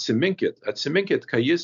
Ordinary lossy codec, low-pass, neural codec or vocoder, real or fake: MP3, 96 kbps; 7.2 kHz; none; real